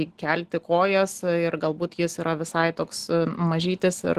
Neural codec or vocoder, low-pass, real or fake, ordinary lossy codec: none; 14.4 kHz; real; Opus, 16 kbps